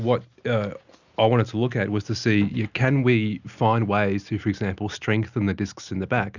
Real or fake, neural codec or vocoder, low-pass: real; none; 7.2 kHz